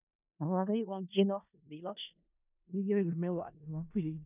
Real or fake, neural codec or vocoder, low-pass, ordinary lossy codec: fake; codec, 16 kHz in and 24 kHz out, 0.4 kbps, LongCat-Audio-Codec, four codebook decoder; 3.6 kHz; none